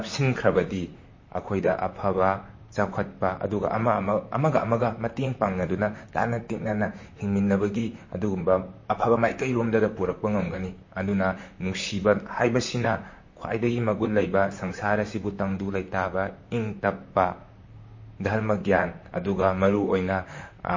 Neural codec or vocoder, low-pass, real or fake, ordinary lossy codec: vocoder, 44.1 kHz, 128 mel bands, Pupu-Vocoder; 7.2 kHz; fake; MP3, 32 kbps